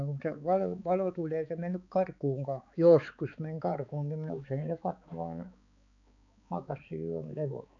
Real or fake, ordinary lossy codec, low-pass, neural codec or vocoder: fake; none; 7.2 kHz; codec, 16 kHz, 4 kbps, X-Codec, HuBERT features, trained on balanced general audio